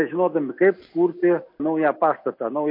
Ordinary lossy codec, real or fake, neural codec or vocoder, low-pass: MP3, 32 kbps; real; none; 5.4 kHz